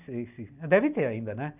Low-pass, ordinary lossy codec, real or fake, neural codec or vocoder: 3.6 kHz; none; real; none